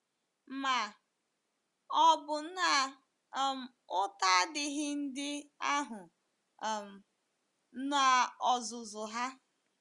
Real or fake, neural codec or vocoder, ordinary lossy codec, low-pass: real; none; Opus, 64 kbps; 10.8 kHz